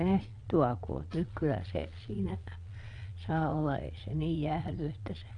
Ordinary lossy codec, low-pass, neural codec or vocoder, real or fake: none; 9.9 kHz; vocoder, 22.05 kHz, 80 mel bands, Vocos; fake